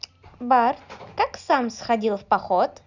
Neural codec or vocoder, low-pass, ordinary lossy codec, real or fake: none; 7.2 kHz; none; real